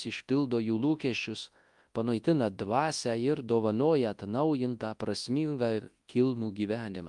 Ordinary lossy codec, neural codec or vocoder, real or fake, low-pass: Opus, 32 kbps; codec, 24 kHz, 0.9 kbps, WavTokenizer, large speech release; fake; 10.8 kHz